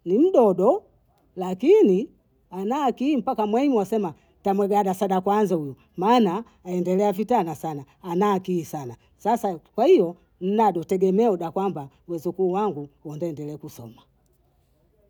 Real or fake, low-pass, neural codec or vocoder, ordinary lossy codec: real; none; none; none